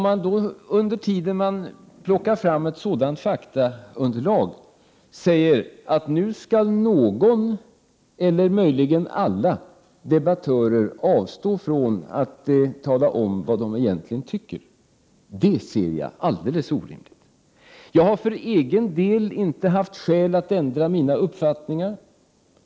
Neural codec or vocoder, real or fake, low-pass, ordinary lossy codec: none; real; none; none